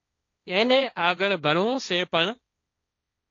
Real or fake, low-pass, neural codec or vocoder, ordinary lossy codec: fake; 7.2 kHz; codec, 16 kHz, 1.1 kbps, Voila-Tokenizer; AAC, 48 kbps